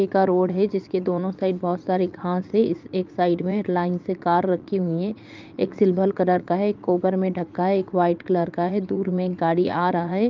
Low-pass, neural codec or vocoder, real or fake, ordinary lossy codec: 7.2 kHz; vocoder, 44.1 kHz, 80 mel bands, Vocos; fake; Opus, 32 kbps